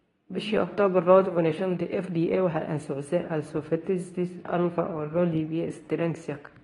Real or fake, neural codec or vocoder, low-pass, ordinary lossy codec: fake; codec, 24 kHz, 0.9 kbps, WavTokenizer, medium speech release version 2; 10.8 kHz; AAC, 32 kbps